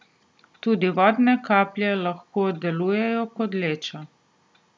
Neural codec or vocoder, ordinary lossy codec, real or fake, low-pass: none; none; real; none